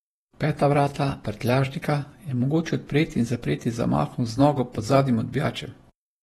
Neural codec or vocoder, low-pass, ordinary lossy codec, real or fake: vocoder, 48 kHz, 128 mel bands, Vocos; 19.8 kHz; AAC, 32 kbps; fake